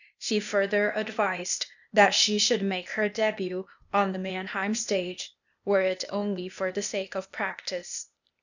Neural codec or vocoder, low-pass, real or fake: codec, 16 kHz, 0.8 kbps, ZipCodec; 7.2 kHz; fake